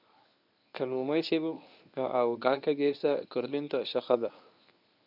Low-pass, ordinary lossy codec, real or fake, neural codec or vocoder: 5.4 kHz; none; fake; codec, 24 kHz, 0.9 kbps, WavTokenizer, medium speech release version 1